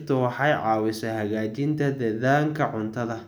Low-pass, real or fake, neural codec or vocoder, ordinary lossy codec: none; real; none; none